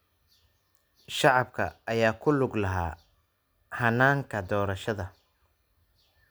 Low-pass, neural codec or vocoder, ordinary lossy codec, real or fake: none; none; none; real